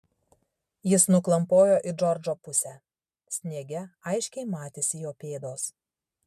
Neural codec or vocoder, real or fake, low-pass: none; real; 14.4 kHz